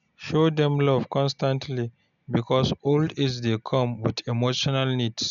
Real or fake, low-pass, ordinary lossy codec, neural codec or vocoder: real; 7.2 kHz; none; none